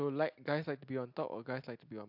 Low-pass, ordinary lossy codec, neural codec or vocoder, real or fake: 5.4 kHz; AAC, 48 kbps; none; real